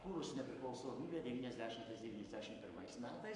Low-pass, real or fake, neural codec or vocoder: 10.8 kHz; fake; codec, 44.1 kHz, 7.8 kbps, Pupu-Codec